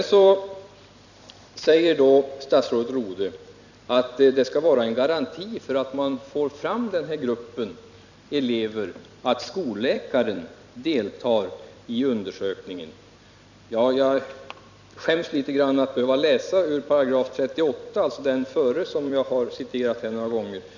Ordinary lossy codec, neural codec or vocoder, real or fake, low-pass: none; vocoder, 44.1 kHz, 128 mel bands every 256 samples, BigVGAN v2; fake; 7.2 kHz